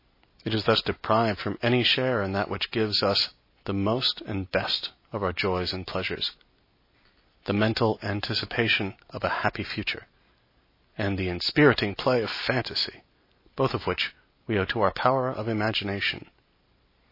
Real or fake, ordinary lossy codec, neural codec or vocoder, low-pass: real; MP3, 24 kbps; none; 5.4 kHz